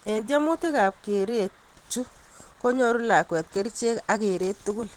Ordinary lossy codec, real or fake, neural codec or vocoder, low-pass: Opus, 16 kbps; fake; vocoder, 44.1 kHz, 128 mel bands every 512 samples, BigVGAN v2; 19.8 kHz